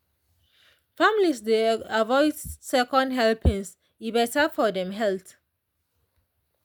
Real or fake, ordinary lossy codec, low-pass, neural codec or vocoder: real; none; none; none